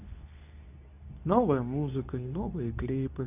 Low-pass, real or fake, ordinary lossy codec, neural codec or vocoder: 3.6 kHz; fake; none; codec, 24 kHz, 0.9 kbps, WavTokenizer, medium speech release version 2